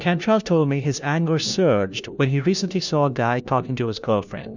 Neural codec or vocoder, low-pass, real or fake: codec, 16 kHz, 1 kbps, FunCodec, trained on LibriTTS, 50 frames a second; 7.2 kHz; fake